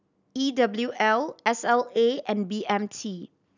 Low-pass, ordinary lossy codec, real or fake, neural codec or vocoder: 7.2 kHz; none; real; none